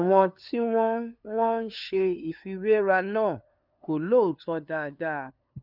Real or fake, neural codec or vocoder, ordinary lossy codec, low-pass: fake; codec, 16 kHz, 2 kbps, FunCodec, trained on LibriTTS, 25 frames a second; none; 5.4 kHz